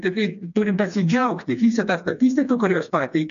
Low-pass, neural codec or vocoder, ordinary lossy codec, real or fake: 7.2 kHz; codec, 16 kHz, 2 kbps, FreqCodec, smaller model; MP3, 64 kbps; fake